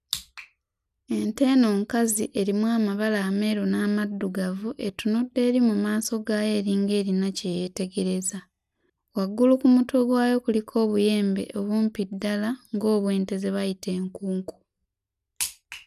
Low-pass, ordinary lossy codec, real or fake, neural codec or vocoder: 14.4 kHz; none; real; none